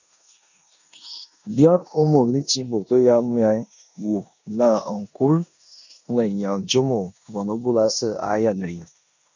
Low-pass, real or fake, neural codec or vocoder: 7.2 kHz; fake; codec, 16 kHz in and 24 kHz out, 0.9 kbps, LongCat-Audio-Codec, four codebook decoder